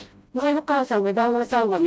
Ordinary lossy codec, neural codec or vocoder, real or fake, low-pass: none; codec, 16 kHz, 0.5 kbps, FreqCodec, smaller model; fake; none